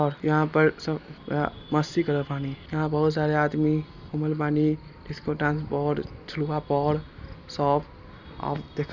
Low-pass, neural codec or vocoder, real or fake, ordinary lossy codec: 7.2 kHz; none; real; Opus, 64 kbps